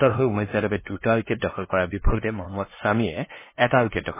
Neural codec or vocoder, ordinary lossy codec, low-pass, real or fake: codec, 16 kHz, 2 kbps, FunCodec, trained on LibriTTS, 25 frames a second; MP3, 16 kbps; 3.6 kHz; fake